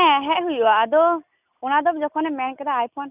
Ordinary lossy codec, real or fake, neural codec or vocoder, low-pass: none; real; none; 3.6 kHz